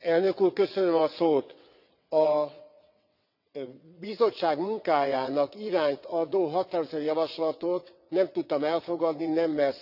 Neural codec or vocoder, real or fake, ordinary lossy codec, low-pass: vocoder, 22.05 kHz, 80 mel bands, WaveNeXt; fake; none; 5.4 kHz